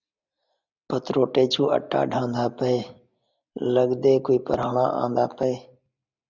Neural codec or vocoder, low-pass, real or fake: none; 7.2 kHz; real